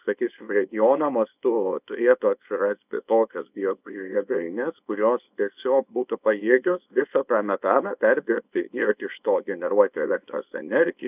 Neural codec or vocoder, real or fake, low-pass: codec, 24 kHz, 0.9 kbps, WavTokenizer, small release; fake; 3.6 kHz